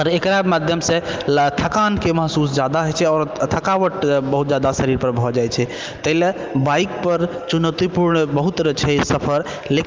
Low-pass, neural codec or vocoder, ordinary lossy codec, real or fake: 7.2 kHz; none; Opus, 32 kbps; real